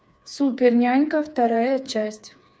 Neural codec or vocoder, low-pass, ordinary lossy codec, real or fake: codec, 16 kHz, 4 kbps, FreqCodec, smaller model; none; none; fake